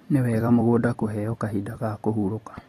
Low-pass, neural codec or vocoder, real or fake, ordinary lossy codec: 19.8 kHz; vocoder, 44.1 kHz, 128 mel bands every 256 samples, BigVGAN v2; fake; AAC, 32 kbps